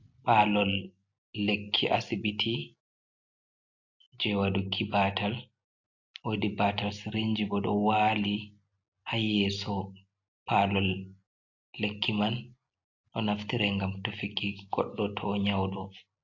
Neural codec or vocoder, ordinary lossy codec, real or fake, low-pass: none; AAC, 48 kbps; real; 7.2 kHz